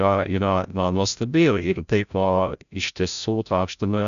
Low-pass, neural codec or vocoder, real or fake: 7.2 kHz; codec, 16 kHz, 0.5 kbps, FreqCodec, larger model; fake